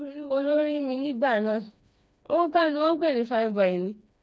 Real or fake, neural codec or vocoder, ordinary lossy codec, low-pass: fake; codec, 16 kHz, 2 kbps, FreqCodec, smaller model; none; none